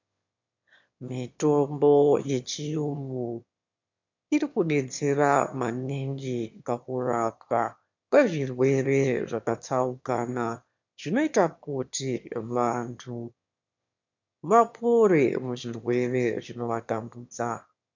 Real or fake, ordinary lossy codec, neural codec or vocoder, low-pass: fake; MP3, 64 kbps; autoencoder, 22.05 kHz, a latent of 192 numbers a frame, VITS, trained on one speaker; 7.2 kHz